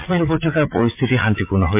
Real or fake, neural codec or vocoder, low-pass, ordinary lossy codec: fake; vocoder, 44.1 kHz, 80 mel bands, Vocos; 3.6 kHz; MP3, 24 kbps